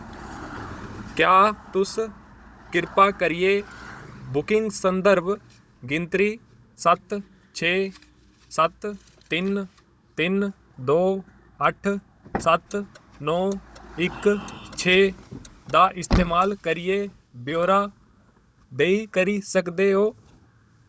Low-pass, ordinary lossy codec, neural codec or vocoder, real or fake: none; none; codec, 16 kHz, 16 kbps, FunCodec, trained on Chinese and English, 50 frames a second; fake